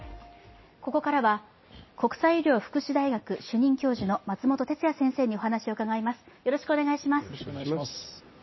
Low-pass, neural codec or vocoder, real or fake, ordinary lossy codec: 7.2 kHz; none; real; MP3, 24 kbps